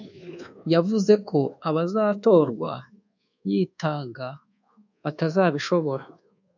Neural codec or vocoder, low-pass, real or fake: codec, 24 kHz, 1.2 kbps, DualCodec; 7.2 kHz; fake